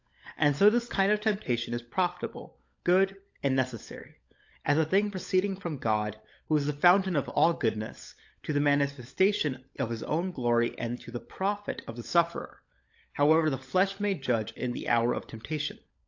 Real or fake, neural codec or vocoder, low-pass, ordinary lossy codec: fake; codec, 16 kHz, 16 kbps, FunCodec, trained on LibriTTS, 50 frames a second; 7.2 kHz; AAC, 48 kbps